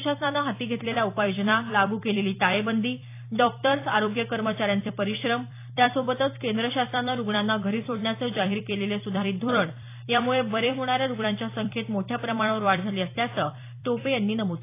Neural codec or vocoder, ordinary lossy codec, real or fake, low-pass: vocoder, 44.1 kHz, 128 mel bands every 256 samples, BigVGAN v2; AAC, 24 kbps; fake; 3.6 kHz